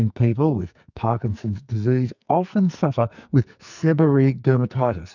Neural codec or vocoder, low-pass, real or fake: codec, 44.1 kHz, 2.6 kbps, SNAC; 7.2 kHz; fake